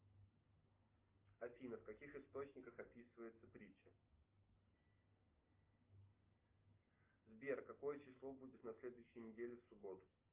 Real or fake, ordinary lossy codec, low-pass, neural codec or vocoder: real; Opus, 24 kbps; 3.6 kHz; none